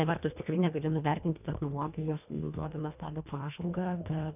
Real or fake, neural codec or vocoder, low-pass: fake; codec, 24 kHz, 1.5 kbps, HILCodec; 3.6 kHz